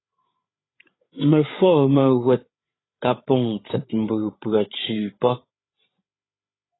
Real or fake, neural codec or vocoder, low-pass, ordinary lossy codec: fake; codec, 16 kHz, 8 kbps, FreqCodec, larger model; 7.2 kHz; AAC, 16 kbps